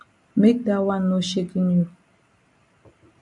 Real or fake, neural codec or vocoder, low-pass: real; none; 10.8 kHz